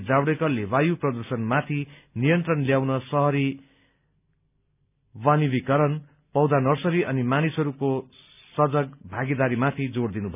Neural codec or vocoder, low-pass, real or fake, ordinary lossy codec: none; 3.6 kHz; real; none